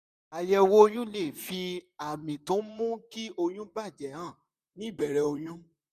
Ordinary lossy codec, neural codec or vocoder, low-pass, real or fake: none; vocoder, 44.1 kHz, 128 mel bands, Pupu-Vocoder; 14.4 kHz; fake